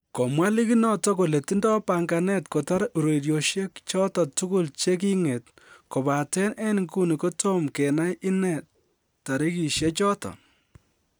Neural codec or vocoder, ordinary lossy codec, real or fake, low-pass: none; none; real; none